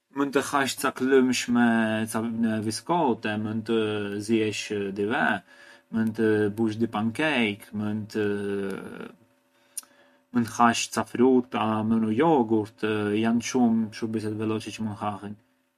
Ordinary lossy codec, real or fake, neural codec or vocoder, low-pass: MP3, 64 kbps; real; none; 14.4 kHz